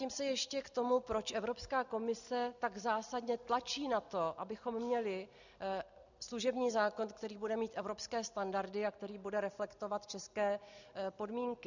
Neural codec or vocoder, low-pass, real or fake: none; 7.2 kHz; real